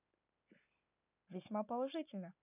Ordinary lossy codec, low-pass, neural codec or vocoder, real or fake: none; 3.6 kHz; none; real